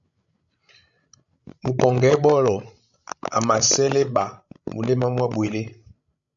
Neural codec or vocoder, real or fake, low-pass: codec, 16 kHz, 16 kbps, FreqCodec, larger model; fake; 7.2 kHz